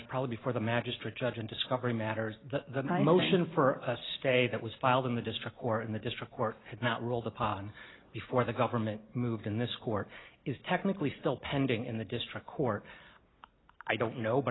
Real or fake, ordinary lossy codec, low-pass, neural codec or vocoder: real; AAC, 16 kbps; 7.2 kHz; none